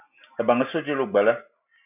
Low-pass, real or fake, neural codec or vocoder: 3.6 kHz; real; none